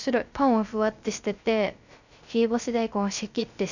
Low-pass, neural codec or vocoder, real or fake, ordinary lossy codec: 7.2 kHz; codec, 16 kHz, 0.3 kbps, FocalCodec; fake; none